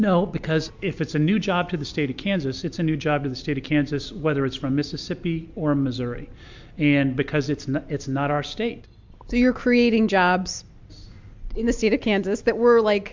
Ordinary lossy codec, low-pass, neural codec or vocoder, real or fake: MP3, 64 kbps; 7.2 kHz; none; real